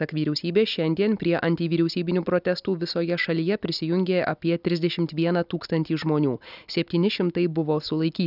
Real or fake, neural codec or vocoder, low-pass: real; none; 5.4 kHz